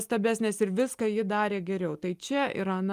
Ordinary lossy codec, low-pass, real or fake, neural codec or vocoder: Opus, 32 kbps; 14.4 kHz; real; none